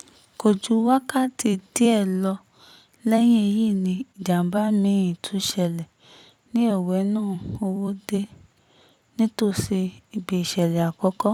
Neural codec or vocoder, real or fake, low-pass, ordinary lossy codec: vocoder, 44.1 kHz, 128 mel bands every 256 samples, BigVGAN v2; fake; 19.8 kHz; none